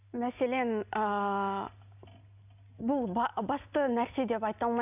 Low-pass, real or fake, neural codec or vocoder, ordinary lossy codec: 3.6 kHz; real; none; none